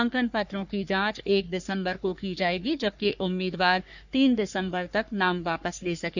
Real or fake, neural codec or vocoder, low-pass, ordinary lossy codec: fake; codec, 44.1 kHz, 3.4 kbps, Pupu-Codec; 7.2 kHz; none